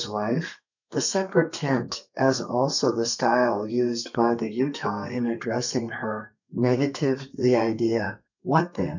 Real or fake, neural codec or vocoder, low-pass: fake; codec, 32 kHz, 1.9 kbps, SNAC; 7.2 kHz